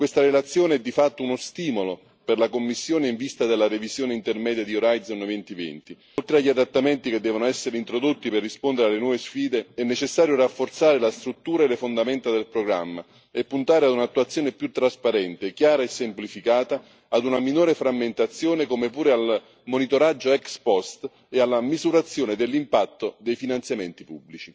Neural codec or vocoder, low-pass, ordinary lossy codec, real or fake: none; none; none; real